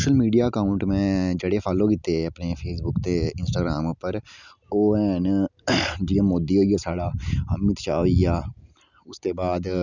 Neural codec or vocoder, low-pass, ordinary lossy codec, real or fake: none; 7.2 kHz; none; real